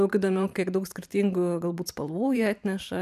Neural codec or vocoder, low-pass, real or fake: vocoder, 44.1 kHz, 128 mel bands every 512 samples, BigVGAN v2; 14.4 kHz; fake